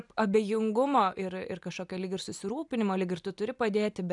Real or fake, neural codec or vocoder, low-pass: real; none; 10.8 kHz